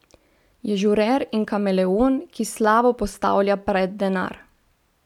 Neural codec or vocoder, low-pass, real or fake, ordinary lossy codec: none; 19.8 kHz; real; none